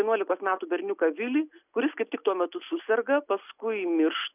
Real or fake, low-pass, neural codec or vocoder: real; 3.6 kHz; none